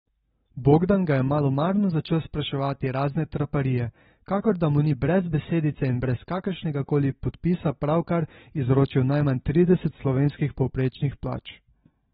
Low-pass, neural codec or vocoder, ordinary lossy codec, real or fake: 19.8 kHz; none; AAC, 16 kbps; real